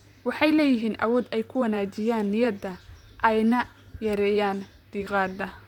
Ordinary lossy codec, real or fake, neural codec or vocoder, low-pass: none; fake; vocoder, 44.1 kHz, 128 mel bands, Pupu-Vocoder; 19.8 kHz